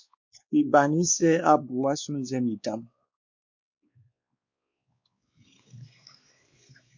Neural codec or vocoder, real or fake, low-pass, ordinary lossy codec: codec, 16 kHz, 2 kbps, X-Codec, WavLM features, trained on Multilingual LibriSpeech; fake; 7.2 kHz; MP3, 48 kbps